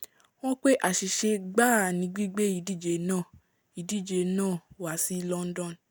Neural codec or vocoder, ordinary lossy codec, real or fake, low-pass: none; none; real; none